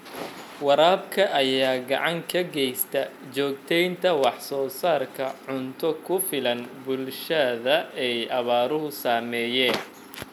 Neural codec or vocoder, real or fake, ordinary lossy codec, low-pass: none; real; none; 19.8 kHz